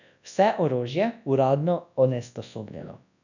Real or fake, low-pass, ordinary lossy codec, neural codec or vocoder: fake; 7.2 kHz; none; codec, 24 kHz, 0.9 kbps, WavTokenizer, large speech release